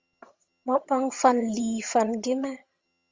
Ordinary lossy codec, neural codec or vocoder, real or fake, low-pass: Opus, 32 kbps; vocoder, 22.05 kHz, 80 mel bands, HiFi-GAN; fake; 7.2 kHz